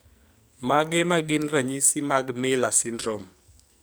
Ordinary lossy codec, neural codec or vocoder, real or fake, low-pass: none; codec, 44.1 kHz, 2.6 kbps, SNAC; fake; none